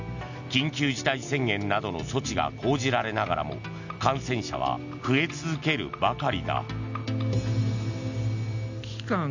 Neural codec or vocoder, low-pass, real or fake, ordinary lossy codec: none; 7.2 kHz; real; none